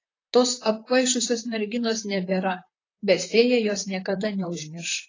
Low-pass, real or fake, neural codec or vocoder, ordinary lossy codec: 7.2 kHz; fake; vocoder, 44.1 kHz, 128 mel bands, Pupu-Vocoder; AAC, 32 kbps